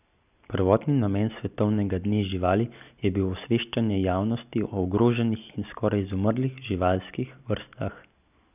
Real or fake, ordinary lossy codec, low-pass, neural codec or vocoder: real; none; 3.6 kHz; none